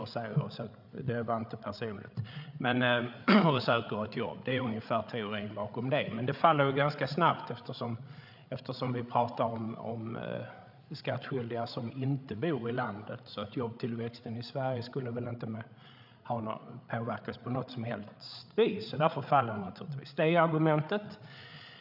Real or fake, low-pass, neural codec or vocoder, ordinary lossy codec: fake; 5.4 kHz; codec, 16 kHz, 8 kbps, FreqCodec, larger model; none